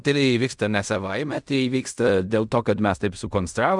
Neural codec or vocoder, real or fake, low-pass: codec, 16 kHz in and 24 kHz out, 0.4 kbps, LongCat-Audio-Codec, fine tuned four codebook decoder; fake; 10.8 kHz